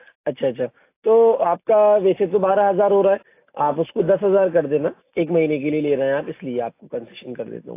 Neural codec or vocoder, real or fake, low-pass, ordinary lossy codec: none; real; 3.6 kHz; AAC, 24 kbps